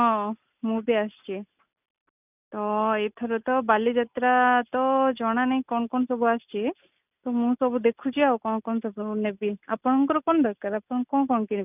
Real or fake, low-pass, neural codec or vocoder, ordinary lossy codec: real; 3.6 kHz; none; none